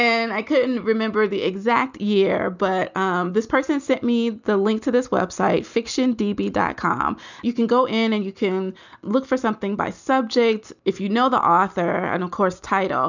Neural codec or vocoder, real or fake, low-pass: none; real; 7.2 kHz